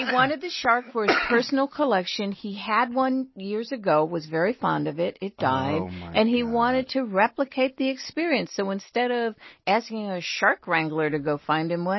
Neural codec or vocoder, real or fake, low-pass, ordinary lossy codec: none; real; 7.2 kHz; MP3, 24 kbps